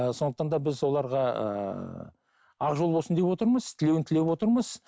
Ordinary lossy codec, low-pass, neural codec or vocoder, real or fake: none; none; none; real